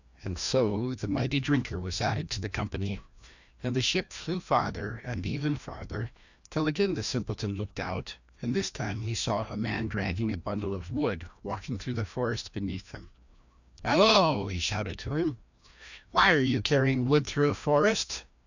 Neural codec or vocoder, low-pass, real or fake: codec, 16 kHz, 1 kbps, FreqCodec, larger model; 7.2 kHz; fake